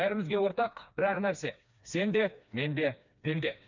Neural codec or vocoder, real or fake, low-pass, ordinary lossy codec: codec, 16 kHz, 2 kbps, FreqCodec, smaller model; fake; 7.2 kHz; none